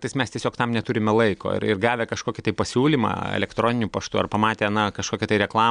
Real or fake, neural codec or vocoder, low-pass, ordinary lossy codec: real; none; 9.9 kHz; MP3, 96 kbps